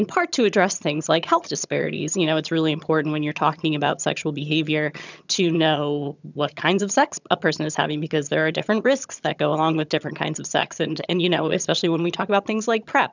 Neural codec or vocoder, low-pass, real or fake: vocoder, 22.05 kHz, 80 mel bands, HiFi-GAN; 7.2 kHz; fake